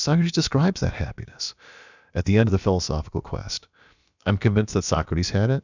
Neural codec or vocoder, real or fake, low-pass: codec, 16 kHz, about 1 kbps, DyCAST, with the encoder's durations; fake; 7.2 kHz